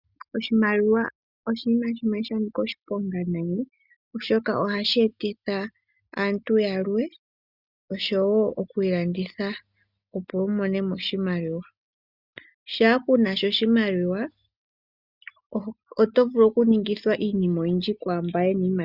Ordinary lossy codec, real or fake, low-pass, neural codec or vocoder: Opus, 64 kbps; real; 5.4 kHz; none